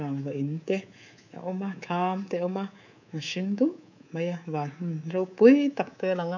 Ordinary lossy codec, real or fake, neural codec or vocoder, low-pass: none; fake; codec, 24 kHz, 3.1 kbps, DualCodec; 7.2 kHz